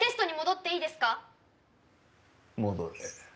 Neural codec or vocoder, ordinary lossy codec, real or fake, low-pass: none; none; real; none